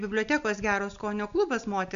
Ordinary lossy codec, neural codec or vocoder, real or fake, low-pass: AAC, 96 kbps; none; real; 7.2 kHz